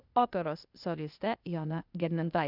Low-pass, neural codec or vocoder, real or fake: 5.4 kHz; codec, 16 kHz, 0.8 kbps, ZipCodec; fake